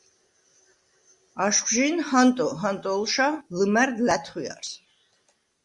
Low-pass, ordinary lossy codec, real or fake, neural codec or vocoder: 10.8 kHz; Opus, 64 kbps; real; none